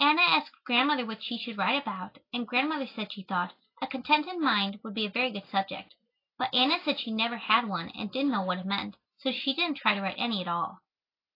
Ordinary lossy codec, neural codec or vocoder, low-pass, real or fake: AAC, 32 kbps; none; 5.4 kHz; real